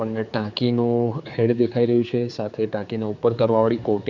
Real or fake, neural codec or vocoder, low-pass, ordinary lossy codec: fake; codec, 16 kHz, 4 kbps, X-Codec, HuBERT features, trained on general audio; 7.2 kHz; none